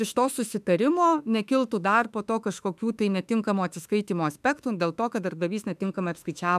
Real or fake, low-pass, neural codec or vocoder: fake; 14.4 kHz; autoencoder, 48 kHz, 32 numbers a frame, DAC-VAE, trained on Japanese speech